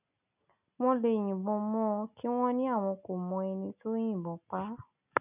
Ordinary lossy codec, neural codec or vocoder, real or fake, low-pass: none; none; real; 3.6 kHz